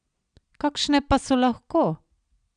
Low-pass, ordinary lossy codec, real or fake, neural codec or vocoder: 9.9 kHz; none; real; none